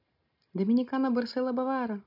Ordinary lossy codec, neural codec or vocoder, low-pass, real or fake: none; none; 5.4 kHz; real